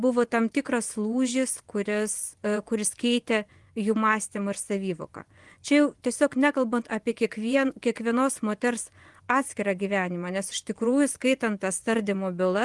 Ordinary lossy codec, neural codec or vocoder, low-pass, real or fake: Opus, 24 kbps; vocoder, 24 kHz, 100 mel bands, Vocos; 10.8 kHz; fake